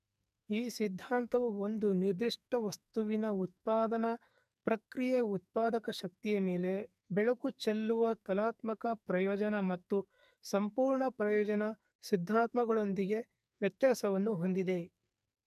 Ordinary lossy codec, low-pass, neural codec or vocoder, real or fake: none; 14.4 kHz; codec, 44.1 kHz, 2.6 kbps, SNAC; fake